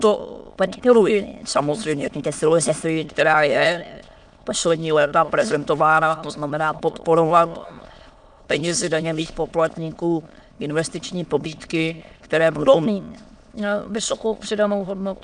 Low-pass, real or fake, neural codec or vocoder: 9.9 kHz; fake; autoencoder, 22.05 kHz, a latent of 192 numbers a frame, VITS, trained on many speakers